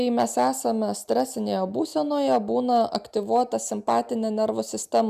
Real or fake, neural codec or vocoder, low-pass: real; none; 14.4 kHz